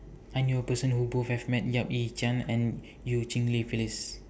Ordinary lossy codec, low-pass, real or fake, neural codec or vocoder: none; none; real; none